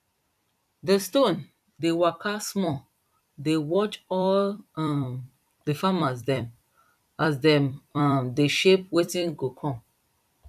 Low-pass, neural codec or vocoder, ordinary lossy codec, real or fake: 14.4 kHz; vocoder, 44.1 kHz, 128 mel bands every 256 samples, BigVGAN v2; none; fake